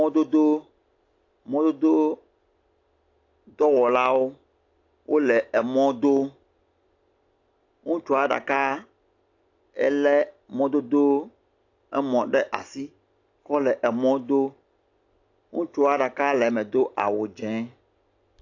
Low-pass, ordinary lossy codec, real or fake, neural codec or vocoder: 7.2 kHz; AAC, 48 kbps; real; none